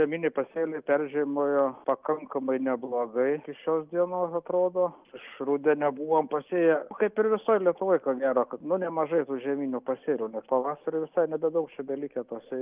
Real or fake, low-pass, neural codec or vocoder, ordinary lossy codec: real; 3.6 kHz; none; Opus, 24 kbps